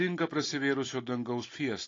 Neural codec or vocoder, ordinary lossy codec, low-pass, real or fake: none; AAC, 32 kbps; 7.2 kHz; real